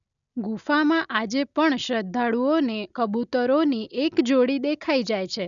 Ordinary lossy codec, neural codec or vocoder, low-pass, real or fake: none; none; 7.2 kHz; real